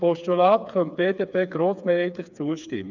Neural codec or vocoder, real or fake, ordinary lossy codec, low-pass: codec, 16 kHz, 4 kbps, FreqCodec, smaller model; fake; none; 7.2 kHz